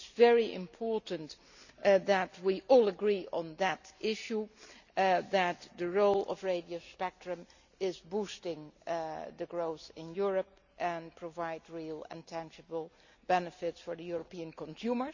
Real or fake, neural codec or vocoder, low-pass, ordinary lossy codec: real; none; 7.2 kHz; none